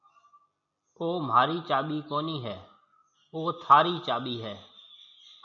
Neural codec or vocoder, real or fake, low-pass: none; real; 7.2 kHz